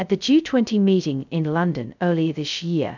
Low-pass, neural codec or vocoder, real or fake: 7.2 kHz; codec, 16 kHz, 0.2 kbps, FocalCodec; fake